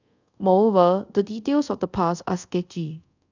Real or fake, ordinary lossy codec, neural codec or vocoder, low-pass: fake; none; codec, 24 kHz, 0.5 kbps, DualCodec; 7.2 kHz